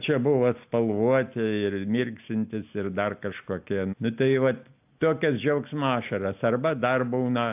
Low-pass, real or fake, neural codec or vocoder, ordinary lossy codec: 3.6 kHz; real; none; AAC, 32 kbps